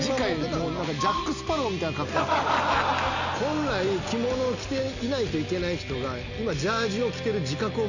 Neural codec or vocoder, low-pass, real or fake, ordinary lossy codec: none; 7.2 kHz; real; none